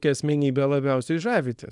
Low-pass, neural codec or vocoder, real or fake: 10.8 kHz; codec, 24 kHz, 0.9 kbps, WavTokenizer, small release; fake